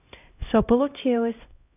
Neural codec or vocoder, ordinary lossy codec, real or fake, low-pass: codec, 16 kHz, 0.5 kbps, X-Codec, HuBERT features, trained on LibriSpeech; none; fake; 3.6 kHz